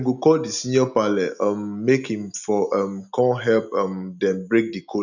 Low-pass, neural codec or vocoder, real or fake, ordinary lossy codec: 7.2 kHz; none; real; none